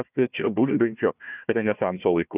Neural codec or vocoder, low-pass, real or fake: codec, 16 kHz, 1 kbps, FunCodec, trained on Chinese and English, 50 frames a second; 3.6 kHz; fake